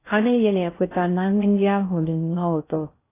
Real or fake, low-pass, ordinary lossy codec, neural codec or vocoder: fake; 3.6 kHz; AAC, 24 kbps; codec, 16 kHz in and 24 kHz out, 0.6 kbps, FocalCodec, streaming, 2048 codes